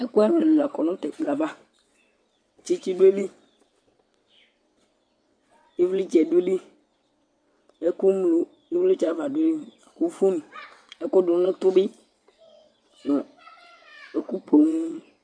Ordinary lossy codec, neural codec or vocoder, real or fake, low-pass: MP3, 64 kbps; vocoder, 22.05 kHz, 80 mel bands, WaveNeXt; fake; 9.9 kHz